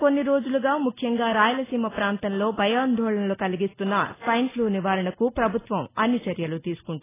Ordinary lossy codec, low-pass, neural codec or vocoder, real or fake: AAC, 16 kbps; 3.6 kHz; none; real